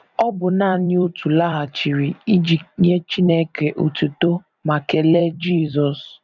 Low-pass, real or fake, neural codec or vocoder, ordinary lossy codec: 7.2 kHz; fake; vocoder, 44.1 kHz, 128 mel bands every 256 samples, BigVGAN v2; none